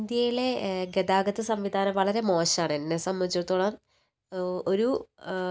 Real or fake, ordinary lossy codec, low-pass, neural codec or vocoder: real; none; none; none